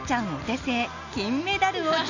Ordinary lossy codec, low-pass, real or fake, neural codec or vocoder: none; 7.2 kHz; real; none